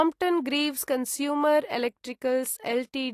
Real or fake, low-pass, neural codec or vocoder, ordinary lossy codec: real; 14.4 kHz; none; AAC, 48 kbps